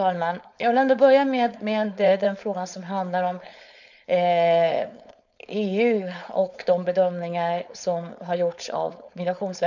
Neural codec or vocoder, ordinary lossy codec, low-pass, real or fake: codec, 16 kHz, 4.8 kbps, FACodec; none; 7.2 kHz; fake